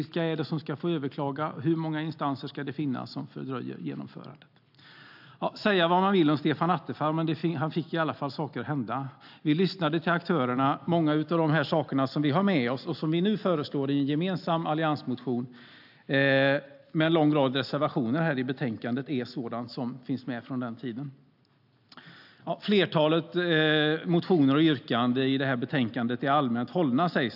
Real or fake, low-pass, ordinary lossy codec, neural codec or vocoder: real; 5.4 kHz; AAC, 48 kbps; none